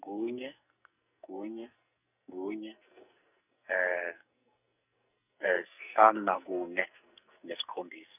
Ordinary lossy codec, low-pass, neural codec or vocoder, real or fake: none; 3.6 kHz; codec, 44.1 kHz, 2.6 kbps, SNAC; fake